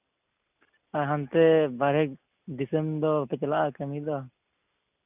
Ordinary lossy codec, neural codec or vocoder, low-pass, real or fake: none; none; 3.6 kHz; real